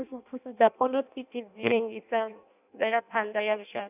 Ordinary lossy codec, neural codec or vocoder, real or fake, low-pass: none; codec, 16 kHz in and 24 kHz out, 0.6 kbps, FireRedTTS-2 codec; fake; 3.6 kHz